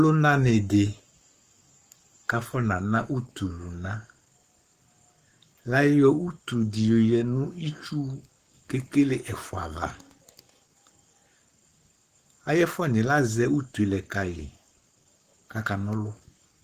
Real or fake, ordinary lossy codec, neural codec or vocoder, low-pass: fake; Opus, 24 kbps; codec, 44.1 kHz, 7.8 kbps, Pupu-Codec; 14.4 kHz